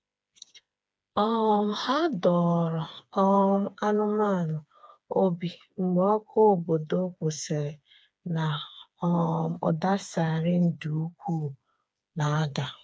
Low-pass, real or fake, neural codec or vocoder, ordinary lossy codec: none; fake; codec, 16 kHz, 4 kbps, FreqCodec, smaller model; none